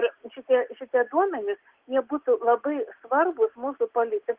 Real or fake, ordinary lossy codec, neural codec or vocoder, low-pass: real; Opus, 16 kbps; none; 3.6 kHz